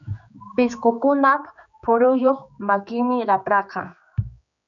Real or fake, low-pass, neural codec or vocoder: fake; 7.2 kHz; codec, 16 kHz, 4 kbps, X-Codec, HuBERT features, trained on general audio